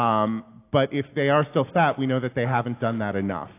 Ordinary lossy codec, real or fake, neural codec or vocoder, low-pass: AAC, 24 kbps; real; none; 3.6 kHz